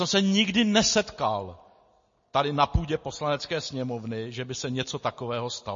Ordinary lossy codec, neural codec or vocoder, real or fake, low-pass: MP3, 32 kbps; none; real; 7.2 kHz